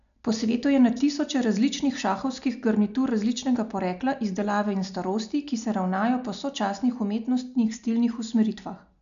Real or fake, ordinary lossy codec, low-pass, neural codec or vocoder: real; none; 7.2 kHz; none